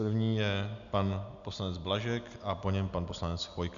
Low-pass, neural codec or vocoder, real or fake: 7.2 kHz; none; real